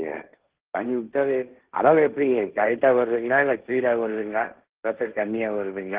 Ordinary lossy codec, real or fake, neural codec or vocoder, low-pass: Opus, 16 kbps; fake; codec, 16 kHz, 1.1 kbps, Voila-Tokenizer; 3.6 kHz